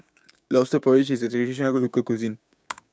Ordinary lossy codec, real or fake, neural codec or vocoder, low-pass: none; fake; codec, 16 kHz, 6 kbps, DAC; none